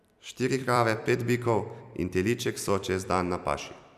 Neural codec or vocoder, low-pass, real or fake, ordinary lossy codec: vocoder, 44.1 kHz, 128 mel bands every 256 samples, BigVGAN v2; 14.4 kHz; fake; none